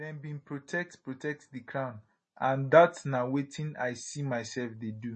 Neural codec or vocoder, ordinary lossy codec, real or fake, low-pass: none; MP3, 32 kbps; real; 10.8 kHz